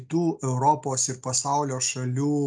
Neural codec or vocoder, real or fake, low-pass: none; real; 9.9 kHz